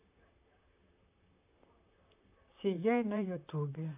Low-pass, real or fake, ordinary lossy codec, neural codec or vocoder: 3.6 kHz; fake; AAC, 24 kbps; vocoder, 44.1 kHz, 128 mel bands, Pupu-Vocoder